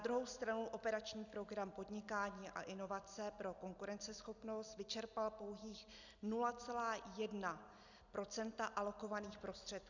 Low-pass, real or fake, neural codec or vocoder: 7.2 kHz; real; none